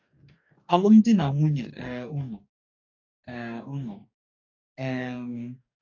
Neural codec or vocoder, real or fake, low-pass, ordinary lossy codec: codec, 44.1 kHz, 2.6 kbps, DAC; fake; 7.2 kHz; AAC, 48 kbps